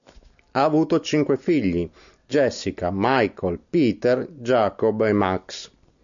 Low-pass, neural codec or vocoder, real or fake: 7.2 kHz; none; real